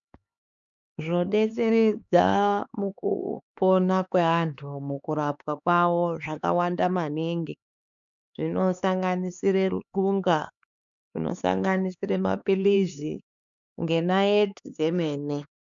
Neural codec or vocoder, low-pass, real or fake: codec, 16 kHz, 4 kbps, X-Codec, HuBERT features, trained on LibriSpeech; 7.2 kHz; fake